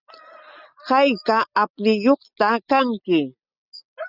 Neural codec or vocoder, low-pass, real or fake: none; 5.4 kHz; real